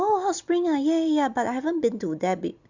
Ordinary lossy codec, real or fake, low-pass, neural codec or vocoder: Opus, 64 kbps; real; 7.2 kHz; none